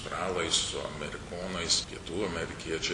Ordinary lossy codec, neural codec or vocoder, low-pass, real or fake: MP3, 48 kbps; none; 10.8 kHz; real